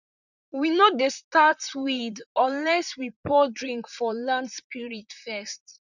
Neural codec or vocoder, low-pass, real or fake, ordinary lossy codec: vocoder, 44.1 kHz, 128 mel bands, Pupu-Vocoder; 7.2 kHz; fake; none